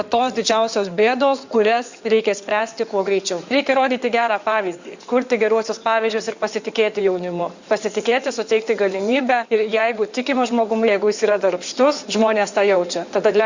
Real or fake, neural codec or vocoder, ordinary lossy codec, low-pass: fake; codec, 16 kHz in and 24 kHz out, 2.2 kbps, FireRedTTS-2 codec; Opus, 64 kbps; 7.2 kHz